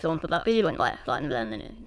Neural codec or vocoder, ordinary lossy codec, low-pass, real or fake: autoencoder, 22.05 kHz, a latent of 192 numbers a frame, VITS, trained on many speakers; none; none; fake